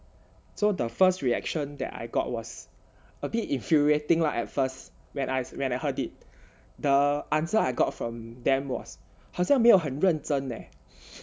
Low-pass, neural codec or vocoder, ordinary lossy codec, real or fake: none; none; none; real